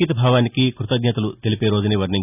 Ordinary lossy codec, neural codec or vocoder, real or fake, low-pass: none; none; real; 3.6 kHz